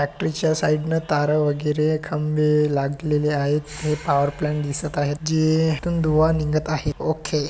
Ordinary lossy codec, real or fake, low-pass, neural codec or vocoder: none; real; none; none